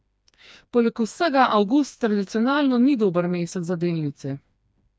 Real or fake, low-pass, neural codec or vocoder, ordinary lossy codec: fake; none; codec, 16 kHz, 2 kbps, FreqCodec, smaller model; none